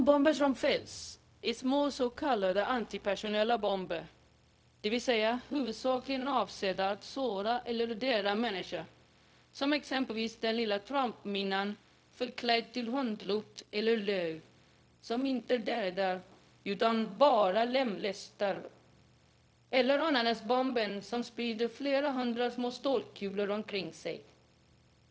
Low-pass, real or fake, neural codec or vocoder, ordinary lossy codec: none; fake; codec, 16 kHz, 0.4 kbps, LongCat-Audio-Codec; none